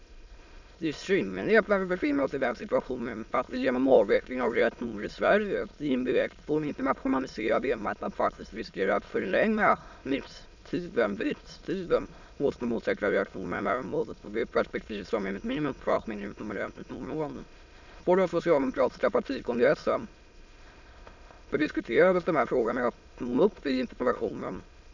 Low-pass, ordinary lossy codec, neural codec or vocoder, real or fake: 7.2 kHz; none; autoencoder, 22.05 kHz, a latent of 192 numbers a frame, VITS, trained on many speakers; fake